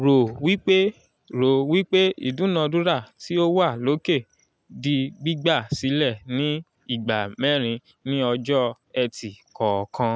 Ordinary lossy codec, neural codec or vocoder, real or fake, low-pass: none; none; real; none